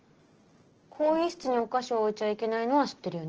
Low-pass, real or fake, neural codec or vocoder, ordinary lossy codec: 7.2 kHz; real; none; Opus, 16 kbps